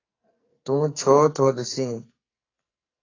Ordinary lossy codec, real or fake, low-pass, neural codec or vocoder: AAC, 32 kbps; fake; 7.2 kHz; codec, 44.1 kHz, 2.6 kbps, SNAC